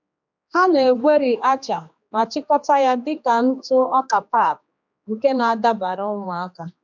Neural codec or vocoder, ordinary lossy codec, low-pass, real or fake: codec, 16 kHz, 2 kbps, X-Codec, HuBERT features, trained on general audio; MP3, 64 kbps; 7.2 kHz; fake